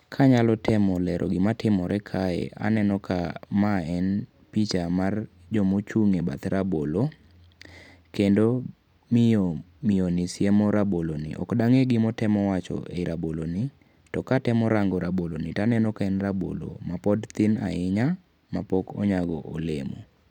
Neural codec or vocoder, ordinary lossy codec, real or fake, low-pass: none; none; real; 19.8 kHz